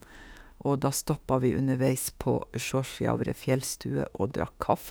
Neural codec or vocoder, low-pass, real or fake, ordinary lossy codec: autoencoder, 48 kHz, 32 numbers a frame, DAC-VAE, trained on Japanese speech; none; fake; none